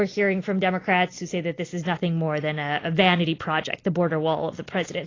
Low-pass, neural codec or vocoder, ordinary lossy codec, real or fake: 7.2 kHz; none; AAC, 32 kbps; real